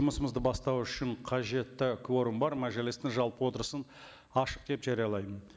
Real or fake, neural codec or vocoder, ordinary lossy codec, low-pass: real; none; none; none